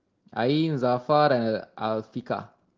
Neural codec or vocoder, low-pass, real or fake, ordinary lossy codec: none; 7.2 kHz; real; Opus, 16 kbps